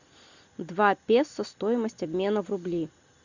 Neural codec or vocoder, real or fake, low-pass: none; real; 7.2 kHz